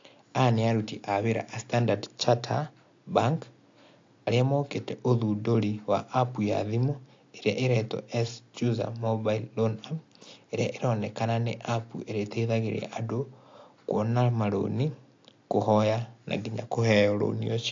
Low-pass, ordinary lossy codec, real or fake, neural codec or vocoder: 7.2 kHz; AAC, 48 kbps; real; none